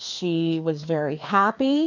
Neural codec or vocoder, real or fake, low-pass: codec, 16 kHz, 2 kbps, FreqCodec, larger model; fake; 7.2 kHz